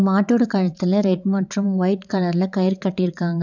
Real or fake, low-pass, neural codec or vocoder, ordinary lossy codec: fake; 7.2 kHz; vocoder, 22.05 kHz, 80 mel bands, WaveNeXt; none